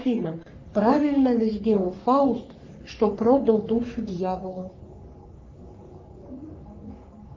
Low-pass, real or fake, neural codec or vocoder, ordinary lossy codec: 7.2 kHz; fake; codec, 44.1 kHz, 3.4 kbps, Pupu-Codec; Opus, 24 kbps